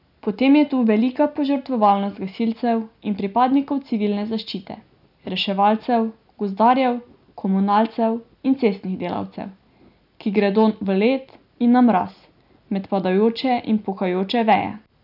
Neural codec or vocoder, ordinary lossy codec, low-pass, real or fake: none; none; 5.4 kHz; real